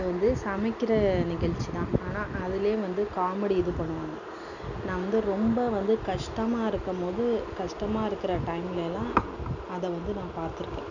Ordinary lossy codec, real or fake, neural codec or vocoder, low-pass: none; real; none; 7.2 kHz